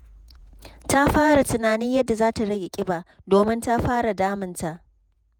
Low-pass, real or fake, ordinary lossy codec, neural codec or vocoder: none; fake; none; vocoder, 48 kHz, 128 mel bands, Vocos